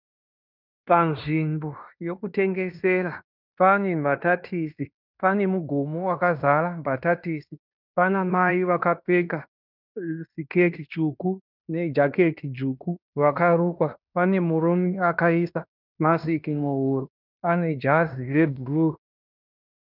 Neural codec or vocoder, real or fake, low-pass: codec, 16 kHz in and 24 kHz out, 0.9 kbps, LongCat-Audio-Codec, fine tuned four codebook decoder; fake; 5.4 kHz